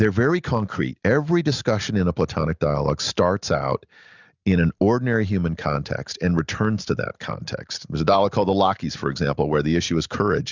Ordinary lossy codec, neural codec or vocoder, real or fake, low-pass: Opus, 64 kbps; none; real; 7.2 kHz